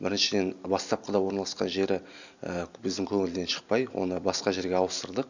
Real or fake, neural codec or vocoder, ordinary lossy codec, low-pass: real; none; none; 7.2 kHz